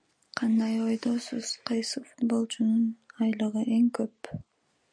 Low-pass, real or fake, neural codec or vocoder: 9.9 kHz; real; none